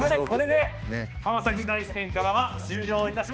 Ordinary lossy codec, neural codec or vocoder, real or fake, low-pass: none; codec, 16 kHz, 2 kbps, X-Codec, HuBERT features, trained on balanced general audio; fake; none